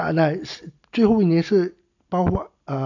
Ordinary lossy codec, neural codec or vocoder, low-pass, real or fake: none; none; 7.2 kHz; real